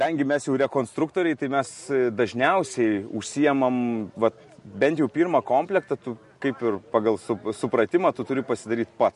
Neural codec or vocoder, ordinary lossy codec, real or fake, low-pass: none; MP3, 48 kbps; real; 14.4 kHz